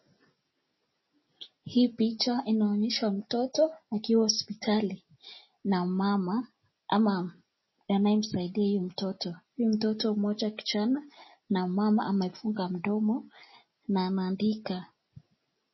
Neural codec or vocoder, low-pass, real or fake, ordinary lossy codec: none; 7.2 kHz; real; MP3, 24 kbps